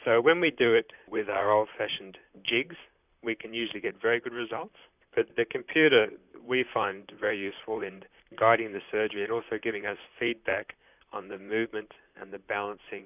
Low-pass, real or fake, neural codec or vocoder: 3.6 kHz; fake; vocoder, 44.1 kHz, 128 mel bands, Pupu-Vocoder